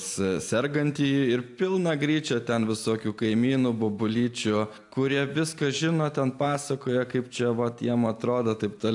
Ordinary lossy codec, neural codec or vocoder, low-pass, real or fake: AAC, 64 kbps; none; 10.8 kHz; real